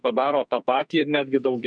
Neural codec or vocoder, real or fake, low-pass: codec, 44.1 kHz, 2.6 kbps, SNAC; fake; 9.9 kHz